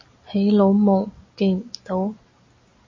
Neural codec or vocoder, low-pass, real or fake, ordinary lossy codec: none; 7.2 kHz; real; MP3, 32 kbps